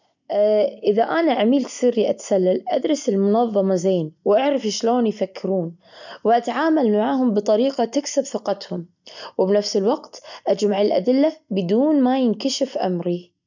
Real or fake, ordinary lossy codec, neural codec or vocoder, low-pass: real; none; none; 7.2 kHz